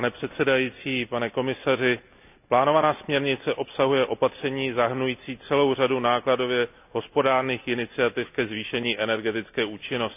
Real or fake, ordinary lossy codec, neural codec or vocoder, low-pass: real; none; none; 3.6 kHz